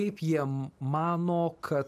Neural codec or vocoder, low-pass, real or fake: none; 14.4 kHz; real